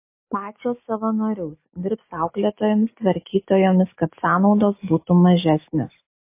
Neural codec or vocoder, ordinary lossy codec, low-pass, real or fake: none; MP3, 24 kbps; 3.6 kHz; real